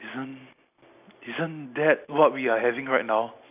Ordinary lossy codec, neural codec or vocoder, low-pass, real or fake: none; none; 3.6 kHz; real